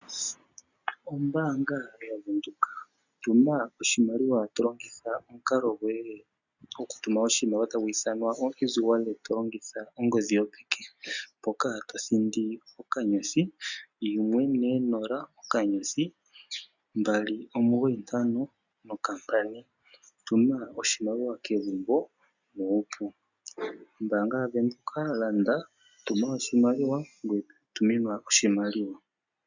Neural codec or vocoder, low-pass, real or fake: none; 7.2 kHz; real